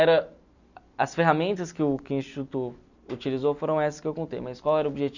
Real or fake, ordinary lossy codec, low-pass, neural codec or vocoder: real; none; 7.2 kHz; none